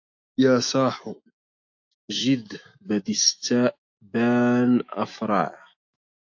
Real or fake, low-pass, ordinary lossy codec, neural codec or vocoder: fake; 7.2 kHz; AAC, 48 kbps; autoencoder, 48 kHz, 128 numbers a frame, DAC-VAE, trained on Japanese speech